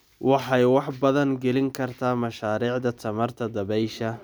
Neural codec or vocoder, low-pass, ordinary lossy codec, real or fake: none; none; none; real